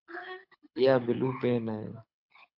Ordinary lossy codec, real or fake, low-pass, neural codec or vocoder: AAC, 48 kbps; fake; 5.4 kHz; codec, 24 kHz, 6 kbps, HILCodec